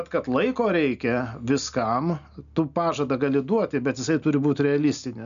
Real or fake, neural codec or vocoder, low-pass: real; none; 7.2 kHz